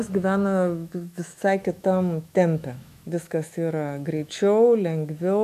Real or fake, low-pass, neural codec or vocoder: fake; 14.4 kHz; autoencoder, 48 kHz, 128 numbers a frame, DAC-VAE, trained on Japanese speech